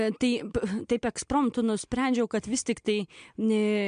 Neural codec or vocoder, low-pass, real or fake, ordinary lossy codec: none; 9.9 kHz; real; MP3, 64 kbps